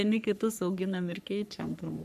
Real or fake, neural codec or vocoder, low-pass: fake; codec, 44.1 kHz, 3.4 kbps, Pupu-Codec; 14.4 kHz